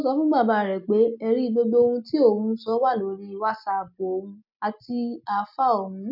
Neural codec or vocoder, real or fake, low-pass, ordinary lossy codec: none; real; 5.4 kHz; none